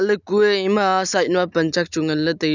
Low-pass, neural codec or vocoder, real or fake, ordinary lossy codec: 7.2 kHz; none; real; none